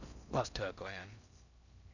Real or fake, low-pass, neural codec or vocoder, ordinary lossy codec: fake; 7.2 kHz; codec, 16 kHz in and 24 kHz out, 0.6 kbps, FocalCodec, streaming, 4096 codes; none